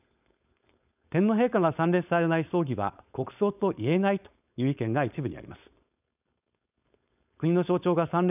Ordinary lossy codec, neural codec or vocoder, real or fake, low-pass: none; codec, 16 kHz, 4.8 kbps, FACodec; fake; 3.6 kHz